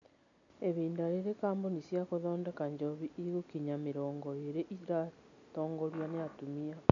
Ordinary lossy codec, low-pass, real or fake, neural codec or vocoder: none; 7.2 kHz; real; none